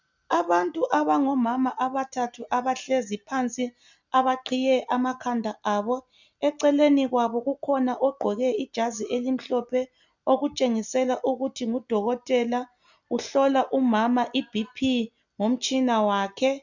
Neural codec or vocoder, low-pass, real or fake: none; 7.2 kHz; real